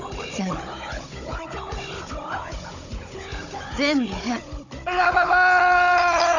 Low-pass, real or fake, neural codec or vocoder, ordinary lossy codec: 7.2 kHz; fake; codec, 16 kHz, 16 kbps, FunCodec, trained on Chinese and English, 50 frames a second; none